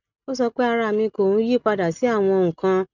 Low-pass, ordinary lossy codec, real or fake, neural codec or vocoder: 7.2 kHz; none; real; none